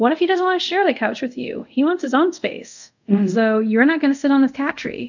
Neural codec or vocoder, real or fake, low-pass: codec, 24 kHz, 0.5 kbps, DualCodec; fake; 7.2 kHz